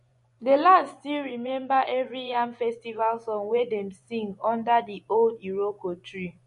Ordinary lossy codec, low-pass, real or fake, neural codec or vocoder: MP3, 48 kbps; 10.8 kHz; fake; vocoder, 24 kHz, 100 mel bands, Vocos